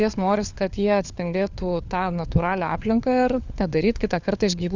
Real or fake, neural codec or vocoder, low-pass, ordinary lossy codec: fake; codec, 16 kHz, 4 kbps, FunCodec, trained on LibriTTS, 50 frames a second; 7.2 kHz; Opus, 64 kbps